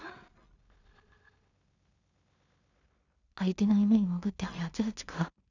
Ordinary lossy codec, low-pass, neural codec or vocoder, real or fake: none; 7.2 kHz; codec, 16 kHz in and 24 kHz out, 0.4 kbps, LongCat-Audio-Codec, two codebook decoder; fake